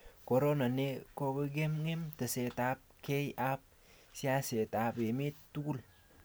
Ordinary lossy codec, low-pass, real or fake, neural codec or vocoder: none; none; real; none